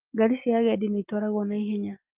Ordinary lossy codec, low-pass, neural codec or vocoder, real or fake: Opus, 24 kbps; 3.6 kHz; none; real